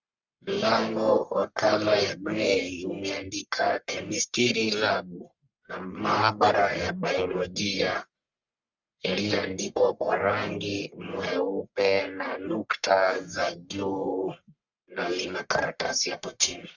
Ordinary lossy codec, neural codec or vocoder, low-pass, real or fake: Opus, 64 kbps; codec, 44.1 kHz, 1.7 kbps, Pupu-Codec; 7.2 kHz; fake